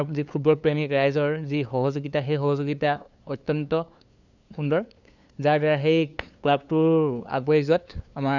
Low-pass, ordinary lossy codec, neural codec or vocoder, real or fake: 7.2 kHz; none; codec, 16 kHz, 2 kbps, FunCodec, trained on LibriTTS, 25 frames a second; fake